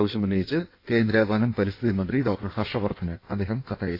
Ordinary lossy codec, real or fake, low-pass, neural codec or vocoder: AAC, 32 kbps; fake; 5.4 kHz; codec, 16 kHz in and 24 kHz out, 1.1 kbps, FireRedTTS-2 codec